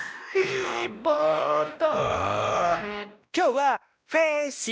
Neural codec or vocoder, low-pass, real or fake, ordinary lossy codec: codec, 16 kHz, 1 kbps, X-Codec, WavLM features, trained on Multilingual LibriSpeech; none; fake; none